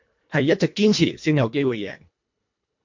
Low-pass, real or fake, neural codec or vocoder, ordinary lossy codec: 7.2 kHz; fake; codec, 24 kHz, 1.5 kbps, HILCodec; MP3, 48 kbps